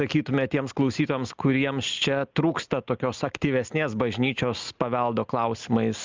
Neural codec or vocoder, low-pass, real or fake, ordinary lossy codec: none; 7.2 kHz; real; Opus, 24 kbps